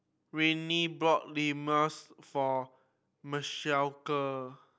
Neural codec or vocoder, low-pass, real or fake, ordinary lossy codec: none; none; real; none